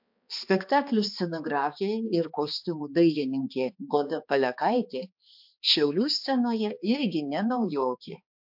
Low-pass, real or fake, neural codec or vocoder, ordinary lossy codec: 5.4 kHz; fake; codec, 16 kHz, 2 kbps, X-Codec, HuBERT features, trained on balanced general audio; MP3, 48 kbps